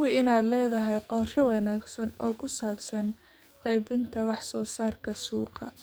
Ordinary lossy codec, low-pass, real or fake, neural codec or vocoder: none; none; fake; codec, 44.1 kHz, 2.6 kbps, SNAC